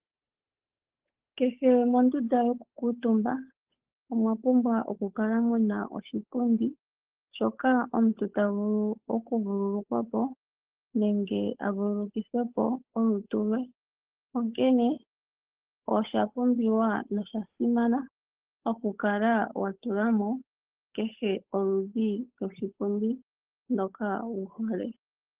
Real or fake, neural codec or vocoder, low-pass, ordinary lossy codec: fake; codec, 16 kHz, 8 kbps, FunCodec, trained on Chinese and English, 25 frames a second; 3.6 kHz; Opus, 16 kbps